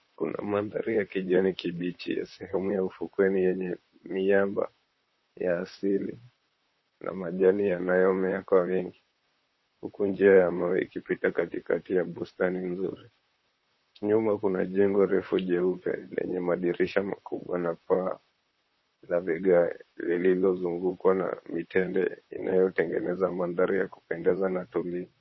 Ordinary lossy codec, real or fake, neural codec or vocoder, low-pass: MP3, 24 kbps; fake; vocoder, 44.1 kHz, 128 mel bands, Pupu-Vocoder; 7.2 kHz